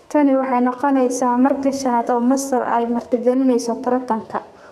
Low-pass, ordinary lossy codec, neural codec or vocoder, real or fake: 14.4 kHz; none; codec, 32 kHz, 1.9 kbps, SNAC; fake